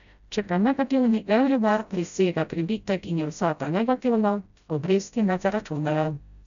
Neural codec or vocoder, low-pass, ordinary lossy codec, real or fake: codec, 16 kHz, 0.5 kbps, FreqCodec, smaller model; 7.2 kHz; none; fake